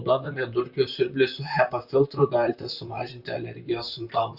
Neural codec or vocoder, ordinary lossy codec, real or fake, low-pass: vocoder, 44.1 kHz, 128 mel bands, Pupu-Vocoder; Opus, 64 kbps; fake; 5.4 kHz